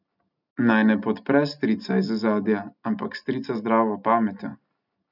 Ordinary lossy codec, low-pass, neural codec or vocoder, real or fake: none; 5.4 kHz; none; real